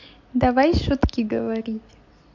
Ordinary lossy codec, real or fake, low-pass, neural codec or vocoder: MP3, 48 kbps; real; 7.2 kHz; none